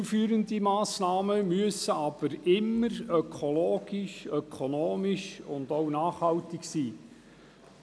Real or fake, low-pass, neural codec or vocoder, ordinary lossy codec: real; none; none; none